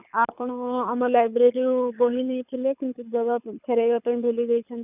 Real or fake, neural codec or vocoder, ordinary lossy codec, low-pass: fake; codec, 24 kHz, 6 kbps, HILCodec; none; 3.6 kHz